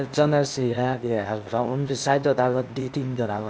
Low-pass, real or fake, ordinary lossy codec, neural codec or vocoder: none; fake; none; codec, 16 kHz, 0.8 kbps, ZipCodec